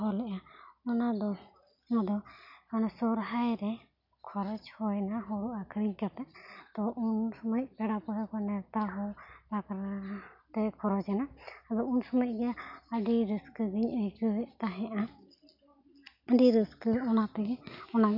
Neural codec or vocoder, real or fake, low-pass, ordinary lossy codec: none; real; 5.4 kHz; none